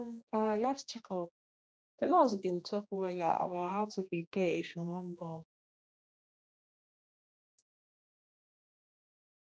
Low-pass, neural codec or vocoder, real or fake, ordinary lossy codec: none; codec, 16 kHz, 1 kbps, X-Codec, HuBERT features, trained on general audio; fake; none